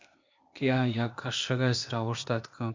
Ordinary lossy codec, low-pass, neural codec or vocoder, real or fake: MP3, 64 kbps; 7.2 kHz; codec, 16 kHz, 0.8 kbps, ZipCodec; fake